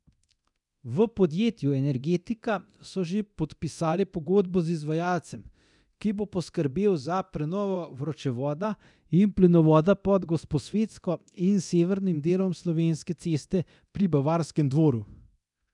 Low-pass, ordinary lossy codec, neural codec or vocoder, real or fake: 10.8 kHz; none; codec, 24 kHz, 0.9 kbps, DualCodec; fake